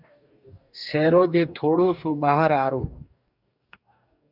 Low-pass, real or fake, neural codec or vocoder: 5.4 kHz; fake; codec, 44.1 kHz, 2.6 kbps, DAC